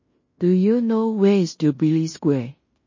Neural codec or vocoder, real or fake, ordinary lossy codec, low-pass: codec, 16 kHz in and 24 kHz out, 0.9 kbps, LongCat-Audio-Codec, fine tuned four codebook decoder; fake; MP3, 32 kbps; 7.2 kHz